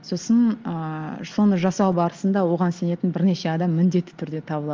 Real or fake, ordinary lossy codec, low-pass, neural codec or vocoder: real; Opus, 32 kbps; 7.2 kHz; none